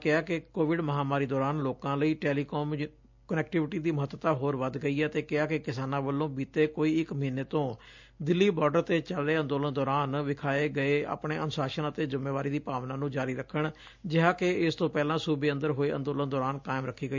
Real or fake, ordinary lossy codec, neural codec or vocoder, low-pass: real; MP3, 64 kbps; none; 7.2 kHz